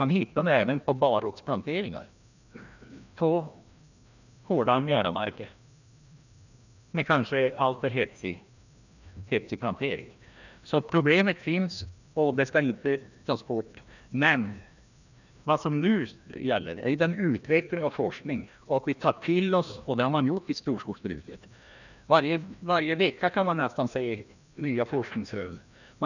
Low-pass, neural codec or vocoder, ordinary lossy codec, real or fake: 7.2 kHz; codec, 16 kHz, 1 kbps, FreqCodec, larger model; none; fake